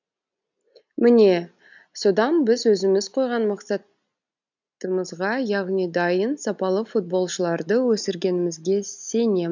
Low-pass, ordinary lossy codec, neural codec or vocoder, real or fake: 7.2 kHz; none; none; real